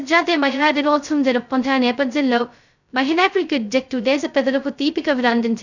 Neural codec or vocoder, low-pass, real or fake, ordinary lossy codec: codec, 16 kHz, 0.2 kbps, FocalCodec; 7.2 kHz; fake; none